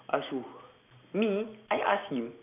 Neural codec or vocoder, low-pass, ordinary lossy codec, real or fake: none; 3.6 kHz; none; real